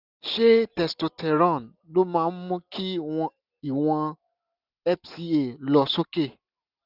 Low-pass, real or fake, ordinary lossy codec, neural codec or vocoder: 5.4 kHz; real; none; none